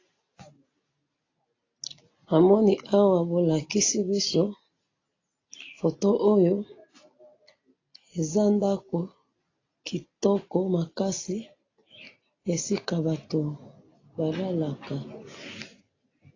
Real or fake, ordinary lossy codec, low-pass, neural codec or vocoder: real; AAC, 32 kbps; 7.2 kHz; none